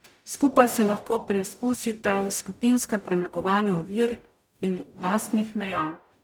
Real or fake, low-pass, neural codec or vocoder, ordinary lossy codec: fake; none; codec, 44.1 kHz, 0.9 kbps, DAC; none